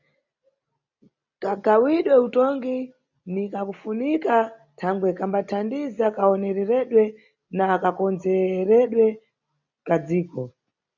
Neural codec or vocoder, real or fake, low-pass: none; real; 7.2 kHz